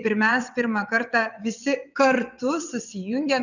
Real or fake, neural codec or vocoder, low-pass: fake; vocoder, 22.05 kHz, 80 mel bands, WaveNeXt; 7.2 kHz